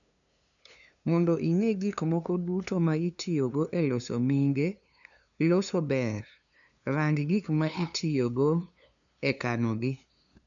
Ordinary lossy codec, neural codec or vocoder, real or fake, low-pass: none; codec, 16 kHz, 2 kbps, FunCodec, trained on LibriTTS, 25 frames a second; fake; 7.2 kHz